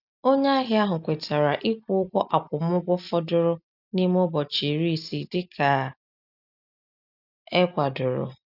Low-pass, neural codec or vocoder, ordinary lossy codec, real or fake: 5.4 kHz; none; none; real